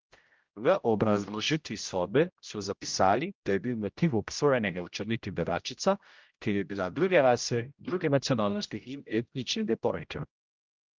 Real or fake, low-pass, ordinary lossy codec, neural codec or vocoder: fake; 7.2 kHz; Opus, 24 kbps; codec, 16 kHz, 0.5 kbps, X-Codec, HuBERT features, trained on general audio